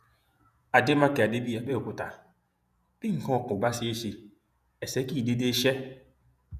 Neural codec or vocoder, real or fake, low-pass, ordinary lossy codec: vocoder, 48 kHz, 128 mel bands, Vocos; fake; 14.4 kHz; none